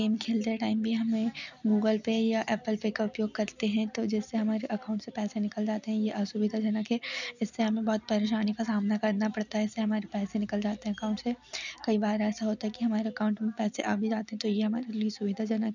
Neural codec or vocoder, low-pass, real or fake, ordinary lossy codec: none; 7.2 kHz; real; none